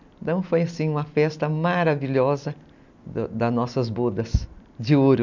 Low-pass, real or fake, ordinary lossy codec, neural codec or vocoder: 7.2 kHz; real; none; none